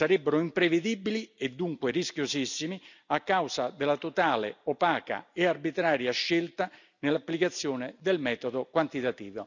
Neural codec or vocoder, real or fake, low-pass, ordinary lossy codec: none; real; 7.2 kHz; none